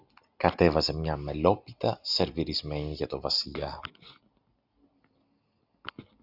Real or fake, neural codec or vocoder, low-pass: real; none; 5.4 kHz